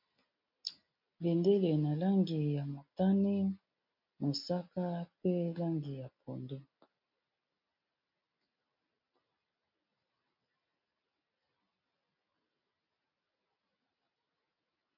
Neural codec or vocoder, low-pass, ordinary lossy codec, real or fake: none; 5.4 kHz; MP3, 48 kbps; real